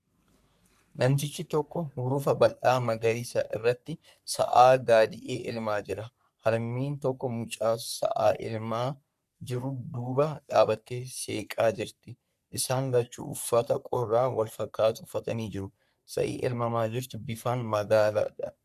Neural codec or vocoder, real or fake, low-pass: codec, 44.1 kHz, 3.4 kbps, Pupu-Codec; fake; 14.4 kHz